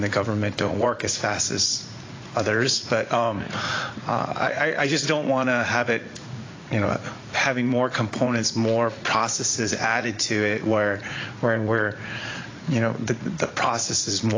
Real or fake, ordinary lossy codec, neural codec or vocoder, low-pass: fake; AAC, 32 kbps; vocoder, 44.1 kHz, 80 mel bands, Vocos; 7.2 kHz